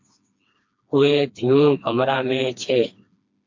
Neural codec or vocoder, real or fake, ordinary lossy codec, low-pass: codec, 16 kHz, 2 kbps, FreqCodec, smaller model; fake; MP3, 48 kbps; 7.2 kHz